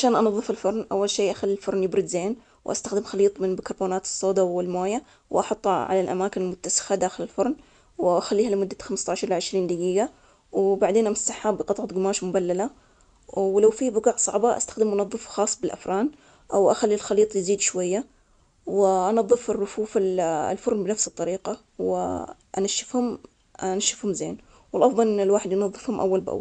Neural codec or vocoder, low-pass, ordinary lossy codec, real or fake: none; 9.9 kHz; none; real